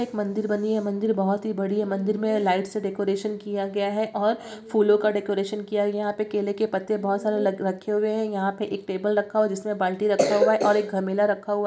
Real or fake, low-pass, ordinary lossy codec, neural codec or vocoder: real; none; none; none